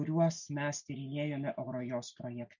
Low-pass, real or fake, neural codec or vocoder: 7.2 kHz; real; none